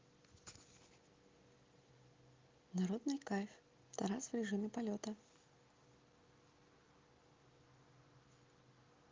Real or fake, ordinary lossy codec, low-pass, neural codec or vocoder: real; Opus, 32 kbps; 7.2 kHz; none